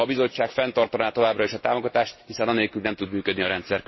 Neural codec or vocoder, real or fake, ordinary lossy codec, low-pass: none; real; MP3, 24 kbps; 7.2 kHz